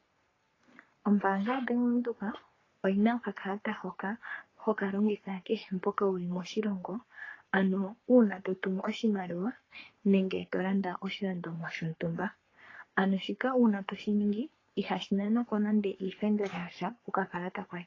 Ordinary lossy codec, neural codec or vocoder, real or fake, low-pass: AAC, 32 kbps; codec, 44.1 kHz, 3.4 kbps, Pupu-Codec; fake; 7.2 kHz